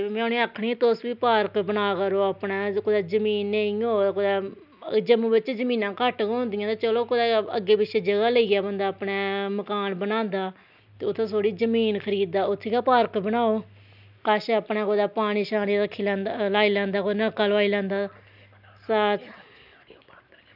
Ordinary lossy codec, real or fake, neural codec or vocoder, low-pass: none; real; none; 5.4 kHz